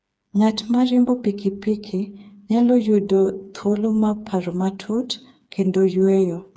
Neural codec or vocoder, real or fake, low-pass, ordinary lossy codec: codec, 16 kHz, 4 kbps, FreqCodec, smaller model; fake; none; none